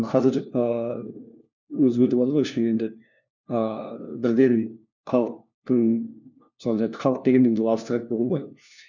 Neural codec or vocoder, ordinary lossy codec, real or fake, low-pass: codec, 16 kHz, 1 kbps, FunCodec, trained on LibriTTS, 50 frames a second; none; fake; 7.2 kHz